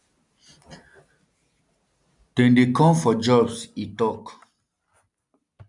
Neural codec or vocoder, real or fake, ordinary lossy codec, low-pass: none; real; none; 10.8 kHz